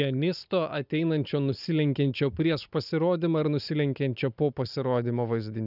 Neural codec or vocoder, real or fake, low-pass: none; real; 5.4 kHz